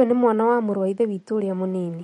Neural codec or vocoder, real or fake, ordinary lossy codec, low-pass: vocoder, 44.1 kHz, 128 mel bands every 256 samples, BigVGAN v2; fake; MP3, 48 kbps; 19.8 kHz